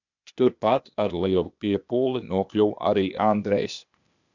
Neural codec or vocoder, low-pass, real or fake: codec, 16 kHz, 0.8 kbps, ZipCodec; 7.2 kHz; fake